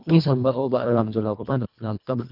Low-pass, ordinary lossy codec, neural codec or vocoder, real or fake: 5.4 kHz; none; codec, 24 kHz, 1.5 kbps, HILCodec; fake